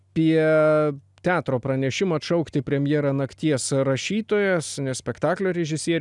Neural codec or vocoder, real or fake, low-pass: none; real; 10.8 kHz